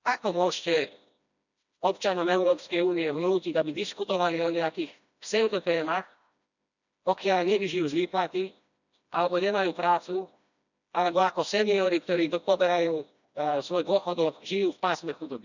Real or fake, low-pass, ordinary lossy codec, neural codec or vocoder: fake; 7.2 kHz; none; codec, 16 kHz, 1 kbps, FreqCodec, smaller model